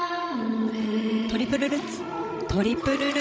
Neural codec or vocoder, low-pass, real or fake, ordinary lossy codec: codec, 16 kHz, 16 kbps, FreqCodec, larger model; none; fake; none